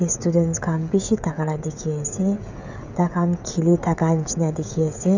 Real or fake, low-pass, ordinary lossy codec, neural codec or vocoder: fake; 7.2 kHz; none; codec, 16 kHz, 16 kbps, FreqCodec, smaller model